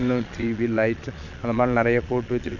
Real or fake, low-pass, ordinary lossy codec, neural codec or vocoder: fake; 7.2 kHz; none; vocoder, 22.05 kHz, 80 mel bands, Vocos